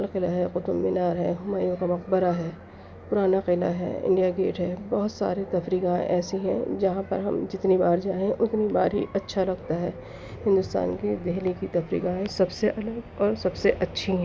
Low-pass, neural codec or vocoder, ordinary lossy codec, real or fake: none; none; none; real